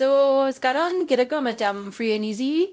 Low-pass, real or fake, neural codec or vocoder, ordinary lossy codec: none; fake; codec, 16 kHz, 0.5 kbps, X-Codec, WavLM features, trained on Multilingual LibriSpeech; none